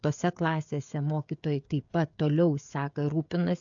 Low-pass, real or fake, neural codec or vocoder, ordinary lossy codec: 7.2 kHz; fake; codec, 16 kHz, 16 kbps, FreqCodec, smaller model; AAC, 64 kbps